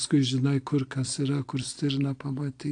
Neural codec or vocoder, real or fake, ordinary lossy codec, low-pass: none; real; AAC, 48 kbps; 9.9 kHz